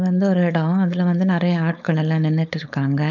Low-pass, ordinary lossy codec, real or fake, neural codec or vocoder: 7.2 kHz; none; fake; codec, 16 kHz, 4.8 kbps, FACodec